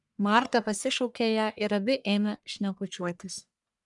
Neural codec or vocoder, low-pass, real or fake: codec, 44.1 kHz, 1.7 kbps, Pupu-Codec; 10.8 kHz; fake